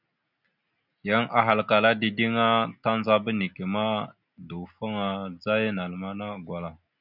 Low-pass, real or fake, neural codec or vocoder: 5.4 kHz; real; none